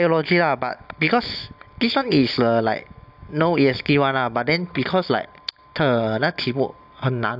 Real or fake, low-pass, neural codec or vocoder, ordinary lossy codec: real; 5.4 kHz; none; AAC, 48 kbps